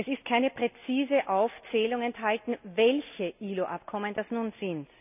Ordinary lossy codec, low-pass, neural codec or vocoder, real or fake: none; 3.6 kHz; none; real